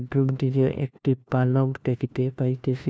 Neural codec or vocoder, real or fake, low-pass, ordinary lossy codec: codec, 16 kHz, 1 kbps, FunCodec, trained on LibriTTS, 50 frames a second; fake; none; none